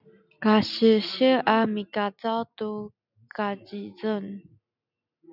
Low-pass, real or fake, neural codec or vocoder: 5.4 kHz; real; none